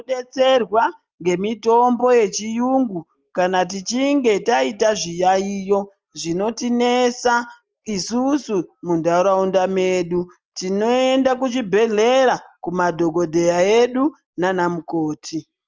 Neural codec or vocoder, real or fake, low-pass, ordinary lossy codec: none; real; 7.2 kHz; Opus, 24 kbps